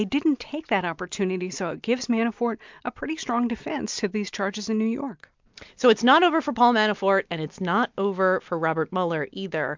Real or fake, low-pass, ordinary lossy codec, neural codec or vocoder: real; 7.2 kHz; MP3, 64 kbps; none